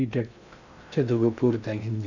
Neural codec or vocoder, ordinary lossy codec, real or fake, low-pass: codec, 16 kHz in and 24 kHz out, 0.6 kbps, FocalCodec, streaming, 2048 codes; none; fake; 7.2 kHz